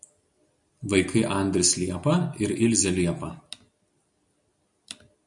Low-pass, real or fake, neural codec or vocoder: 10.8 kHz; real; none